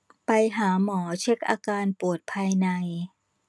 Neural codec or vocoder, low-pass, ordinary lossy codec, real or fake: none; none; none; real